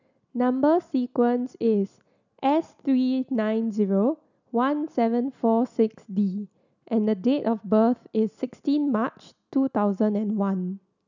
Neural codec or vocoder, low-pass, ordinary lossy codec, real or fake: none; 7.2 kHz; none; real